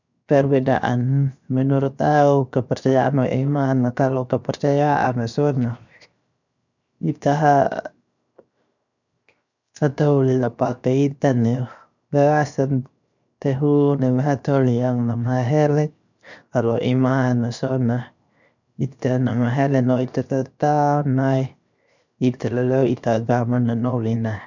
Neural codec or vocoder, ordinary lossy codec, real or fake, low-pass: codec, 16 kHz, 0.7 kbps, FocalCodec; none; fake; 7.2 kHz